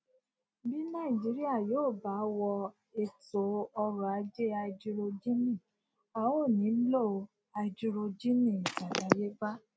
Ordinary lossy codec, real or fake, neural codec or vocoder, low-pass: none; real; none; none